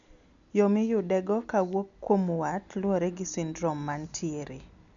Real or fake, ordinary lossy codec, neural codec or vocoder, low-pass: real; none; none; 7.2 kHz